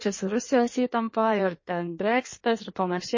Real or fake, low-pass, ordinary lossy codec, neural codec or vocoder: fake; 7.2 kHz; MP3, 32 kbps; codec, 16 kHz in and 24 kHz out, 1.1 kbps, FireRedTTS-2 codec